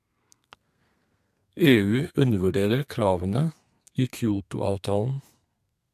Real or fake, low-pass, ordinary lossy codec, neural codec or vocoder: fake; 14.4 kHz; MP3, 96 kbps; codec, 32 kHz, 1.9 kbps, SNAC